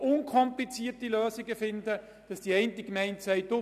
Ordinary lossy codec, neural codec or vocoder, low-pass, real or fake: none; none; 14.4 kHz; real